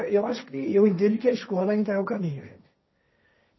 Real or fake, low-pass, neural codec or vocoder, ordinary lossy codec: fake; 7.2 kHz; codec, 16 kHz, 1.1 kbps, Voila-Tokenizer; MP3, 24 kbps